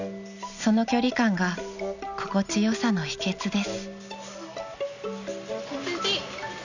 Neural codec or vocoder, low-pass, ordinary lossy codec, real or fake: none; 7.2 kHz; none; real